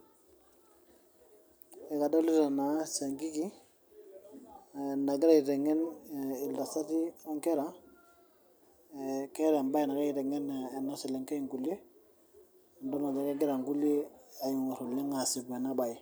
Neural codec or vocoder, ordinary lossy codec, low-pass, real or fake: none; none; none; real